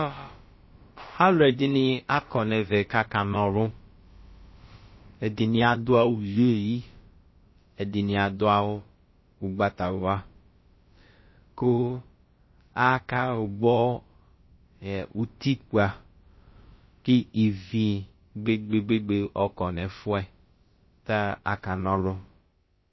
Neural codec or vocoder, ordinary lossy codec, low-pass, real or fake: codec, 16 kHz, about 1 kbps, DyCAST, with the encoder's durations; MP3, 24 kbps; 7.2 kHz; fake